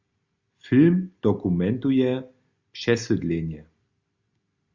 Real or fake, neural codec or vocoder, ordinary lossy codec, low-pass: real; none; Opus, 64 kbps; 7.2 kHz